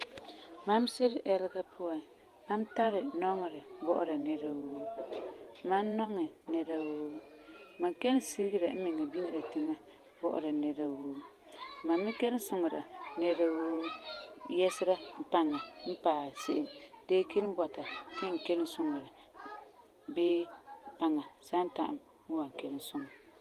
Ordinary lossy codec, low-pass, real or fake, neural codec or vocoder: Opus, 32 kbps; 14.4 kHz; fake; vocoder, 48 kHz, 128 mel bands, Vocos